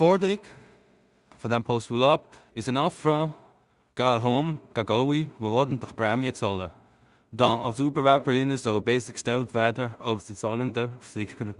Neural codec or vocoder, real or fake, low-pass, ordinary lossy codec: codec, 16 kHz in and 24 kHz out, 0.4 kbps, LongCat-Audio-Codec, two codebook decoder; fake; 10.8 kHz; Opus, 64 kbps